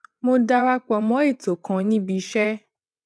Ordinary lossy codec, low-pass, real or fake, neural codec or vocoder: none; none; fake; vocoder, 22.05 kHz, 80 mel bands, WaveNeXt